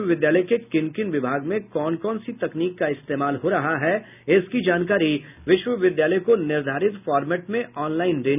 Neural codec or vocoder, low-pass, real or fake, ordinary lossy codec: none; 3.6 kHz; real; none